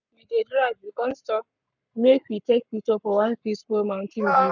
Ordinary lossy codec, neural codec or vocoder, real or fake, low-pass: none; codec, 44.1 kHz, 7.8 kbps, Pupu-Codec; fake; 7.2 kHz